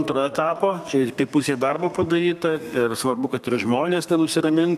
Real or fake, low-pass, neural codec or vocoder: fake; 14.4 kHz; codec, 32 kHz, 1.9 kbps, SNAC